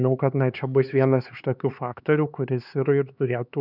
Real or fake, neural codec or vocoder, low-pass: fake; codec, 16 kHz, 4 kbps, X-Codec, HuBERT features, trained on LibriSpeech; 5.4 kHz